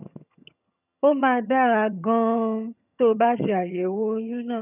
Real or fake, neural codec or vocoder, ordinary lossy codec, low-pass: fake; vocoder, 22.05 kHz, 80 mel bands, HiFi-GAN; none; 3.6 kHz